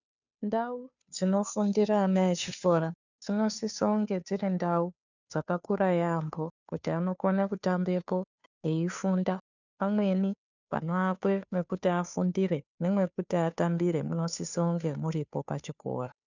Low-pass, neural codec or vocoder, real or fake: 7.2 kHz; codec, 16 kHz, 2 kbps, FunCodec, trained on Chinese and English, 25 frames a second; fake